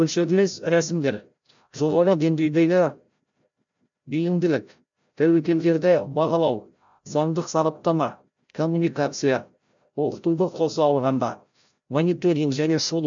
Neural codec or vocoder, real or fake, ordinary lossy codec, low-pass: codec, 16 kHz, 0.5 kbps, FreqCodec, larger model; fake; MP3, 64 kbps; 7.2 kHz